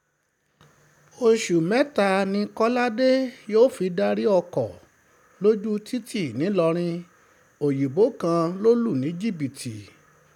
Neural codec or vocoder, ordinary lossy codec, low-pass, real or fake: none; none; 19.8 kHz; real